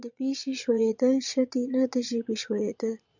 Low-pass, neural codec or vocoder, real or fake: 7.2 kHz; vocoder, 22.05 kHz, 80 mel bands, Vocos; fake